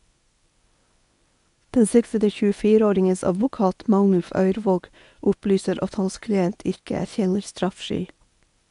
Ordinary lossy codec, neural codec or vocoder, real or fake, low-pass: none; codec, 24 kHz, 0.9 kbps, WavTokenizer, medium speech release version 1; fake; 10.8 kHz